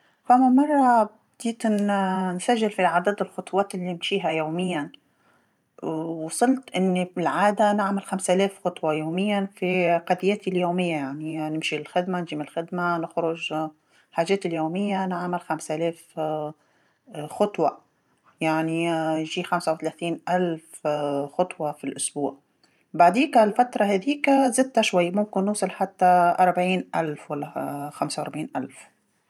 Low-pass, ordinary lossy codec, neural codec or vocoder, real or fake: 19.8 kHz; none; vocoder, 44.1 kHz, 128 mel bands every 512 samples, BigVGAN v2; fake